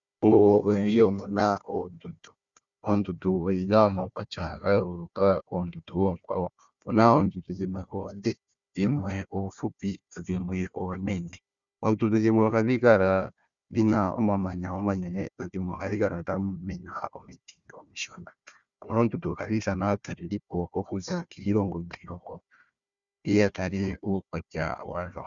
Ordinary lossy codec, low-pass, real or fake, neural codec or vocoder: Opus, 64 kbps; 7.2 kHz; fake; codec, 16 kHz, 1 kbps, FunCodec, trained on Chinese and English, 50 frames a second